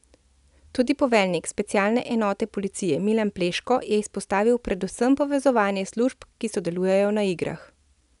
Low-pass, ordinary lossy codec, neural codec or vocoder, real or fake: 10.8 kHz; none; none; real